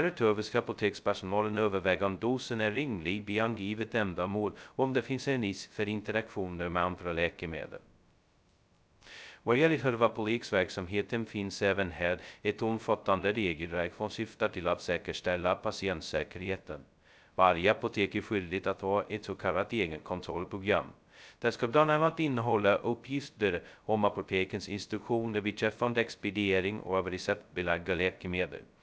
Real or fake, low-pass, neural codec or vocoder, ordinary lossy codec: fake; none; codec, 16 kHz, 0.2 kbps, FocalCodec; none